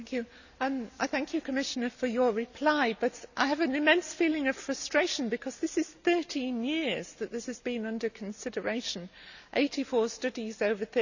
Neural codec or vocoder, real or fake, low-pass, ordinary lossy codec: none; real; 7.2 kHz; none